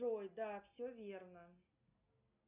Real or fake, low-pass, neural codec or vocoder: real; 3.6 kHz; none